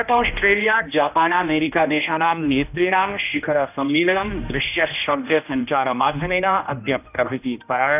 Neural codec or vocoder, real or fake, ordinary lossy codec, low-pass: codec, 16 kHz, 1 kbps, X-Codec, HuBERT features, trained on general audio; fake; none; 3.6 kHz